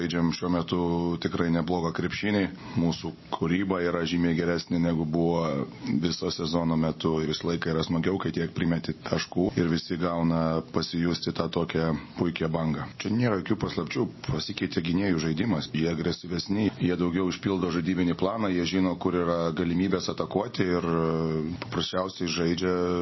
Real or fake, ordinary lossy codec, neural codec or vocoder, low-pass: real; MP3, 24 kbps; none; 7.2 kHz